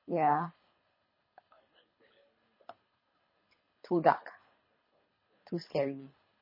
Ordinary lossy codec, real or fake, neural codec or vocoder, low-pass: MP3, 24 kbps; fake; codec, 24 kHz, 3 kbps, HILCodec; 5.4 kHz